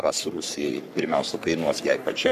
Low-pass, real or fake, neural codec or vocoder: 14.4 kHz; fake; codec, 44.1 kHz, 3.4 kbps, Pupu-Codec